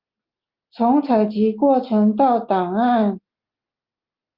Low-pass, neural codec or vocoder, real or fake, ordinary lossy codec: 5.4 kHz; none; real; Opus, 32 kbps